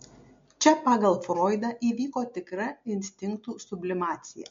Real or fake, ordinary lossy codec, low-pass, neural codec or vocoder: real; MP3, 48 kbps; 7.2 kHz; none